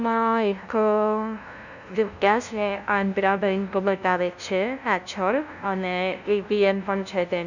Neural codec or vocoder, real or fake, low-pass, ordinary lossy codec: codec, 16 kHz, 0.5 kbps, FunCodec, trained on LibriTTS, 25 frames a second; fake; 7.2 kHz; none